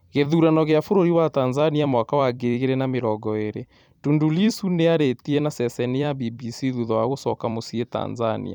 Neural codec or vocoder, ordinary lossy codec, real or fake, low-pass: vocoder, 44.1 kHz, 128 mel bands every 256 samples, BigVGAN v2; none; fake; 19.8 kHz